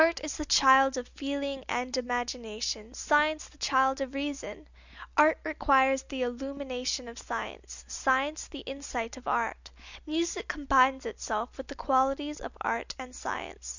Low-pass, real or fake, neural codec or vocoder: 7.2 kHz; real; none